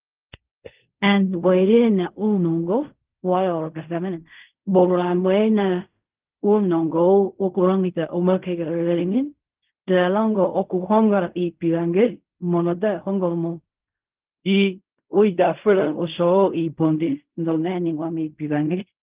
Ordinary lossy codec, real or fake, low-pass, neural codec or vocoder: Opus, 32 kbps; fake; 3.6 kHz; codec, 16 kHz in and 24 kHz out, 0.4 kbps, LongCat-Audio-Codec, fine tuned four codebook decoder